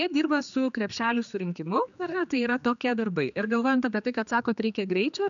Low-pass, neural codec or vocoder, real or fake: 7.2 kHz; codec, 16 kHz, 2 kbps, X-Codec, HuBERT features, trained on general audio; fake